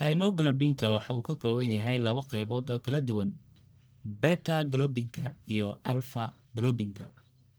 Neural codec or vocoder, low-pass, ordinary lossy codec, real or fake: codec, 44.1 kHz, 1.7 kbps, Pupu-Codec; none; none; fake